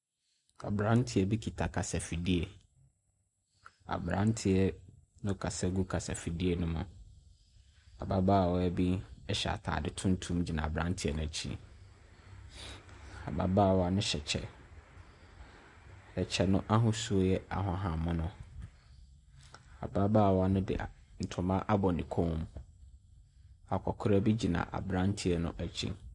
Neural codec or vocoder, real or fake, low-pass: none; real; 10.8 kHz